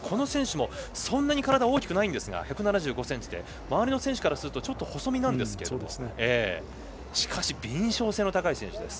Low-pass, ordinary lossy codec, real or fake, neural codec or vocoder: none; none; real; none